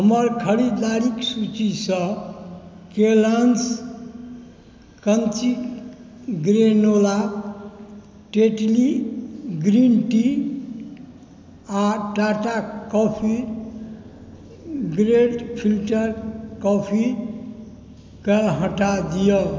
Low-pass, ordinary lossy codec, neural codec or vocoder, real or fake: none; none; none; real